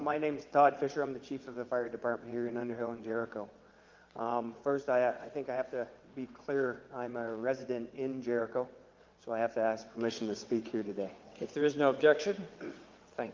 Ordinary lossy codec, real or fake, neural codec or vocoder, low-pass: Opus, 24 kbps; fake; vocoder, 22.05 kHz, 80 mel bands, WaveNeXt; 7.2 kHz